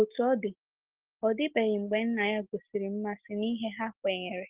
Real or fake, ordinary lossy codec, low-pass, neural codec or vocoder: real; Opus, 16 kbps; 3.6 kHz; none